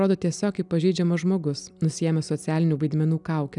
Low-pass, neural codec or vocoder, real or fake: 10.8 kHz; none; real